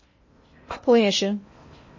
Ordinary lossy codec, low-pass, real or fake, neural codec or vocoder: MP3, 32 kbps; 7.2 kHz; fake; codec, 16 kHz in and 24 kHz out, 0.6 kbps, FocalCodec, streaming, 2048 codes